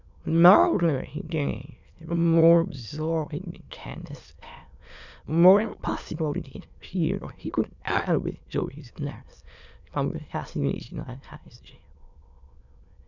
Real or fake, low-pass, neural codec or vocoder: fake; 7.2 kHz; autoencoder, 22.05 kHz, a latent of 192 numbers a frame, VITS, trained on many speakers